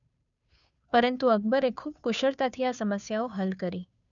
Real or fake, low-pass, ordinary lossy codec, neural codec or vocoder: fake; 7.2 kHz; none; codec, 16 kHz, 2 kbps, FunCodec, trained on Chinese and English, 25 frames a second